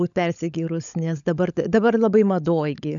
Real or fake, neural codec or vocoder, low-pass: fake; codec, 16 kHz, 16 kbps, FunCodec, trained on LibriTTS, 50 frames a second; 7.2 kHz